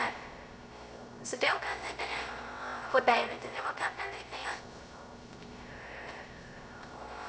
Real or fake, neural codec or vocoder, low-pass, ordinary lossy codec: fake; codec, 16 kHz, 0.3 kbps, FocalCodec; none; none